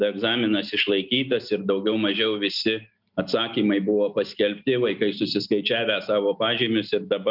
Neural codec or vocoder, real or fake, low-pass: none; real; 5.4 kHz